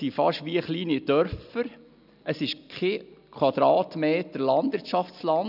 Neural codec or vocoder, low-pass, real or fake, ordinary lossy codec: none; 5.4 kHz; real; none